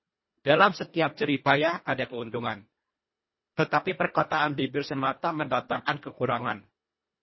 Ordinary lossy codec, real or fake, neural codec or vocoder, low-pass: MP3, 24 kbps; fake; codec, 24 kHz, 1.5 kbps, HILCodec; 7.2 kHz